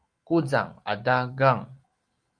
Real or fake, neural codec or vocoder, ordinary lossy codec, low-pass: real; none; Opus, 32 kbps; 9.9 kHz